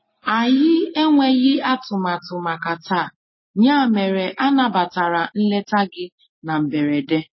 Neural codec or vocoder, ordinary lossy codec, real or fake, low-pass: none; MP3, 24 kbps; real; 7.2 kHz